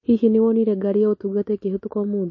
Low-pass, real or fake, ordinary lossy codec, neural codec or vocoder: 7.2 kHz; real; MP3, 32 kbps; none